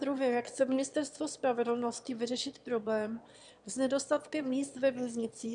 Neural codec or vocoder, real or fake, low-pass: autoencoder, 22.05 kHz, a latent of 192 numbers a frame, VITS, trained on one speaker; fake; 9.9 kHz